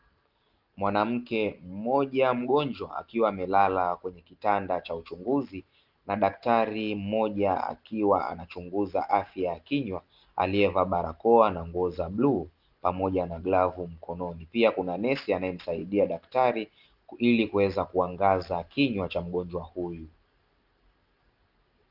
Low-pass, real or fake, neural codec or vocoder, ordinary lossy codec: 5.4 kHz; real; none; Opus, 32 kbps